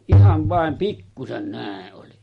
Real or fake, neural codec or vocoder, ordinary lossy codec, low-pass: real; none; MP3, 48 kbps; 19.8 kHz